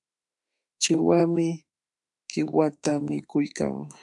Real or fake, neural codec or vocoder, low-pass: fake; autoencoder, 48 kHz, 32 numbers a frame, DAC-VAE, trained on Japanese speech; 10.8 kHz